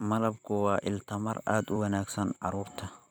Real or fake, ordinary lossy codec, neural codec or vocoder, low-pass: fake; none; vocoder, 44.1 kHz, 128 mel bands every 256 samples, BigVGAN v2; none